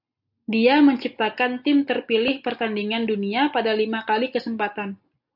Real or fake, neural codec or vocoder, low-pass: real; none; 5.4 kHz